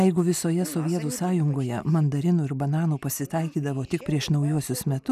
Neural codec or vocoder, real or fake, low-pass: none; real; 14.4 kHz